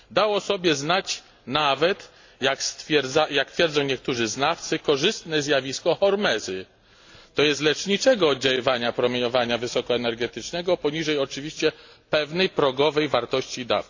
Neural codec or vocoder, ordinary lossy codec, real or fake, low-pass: none; AAC, 48 kbps; real; 7.2 kHz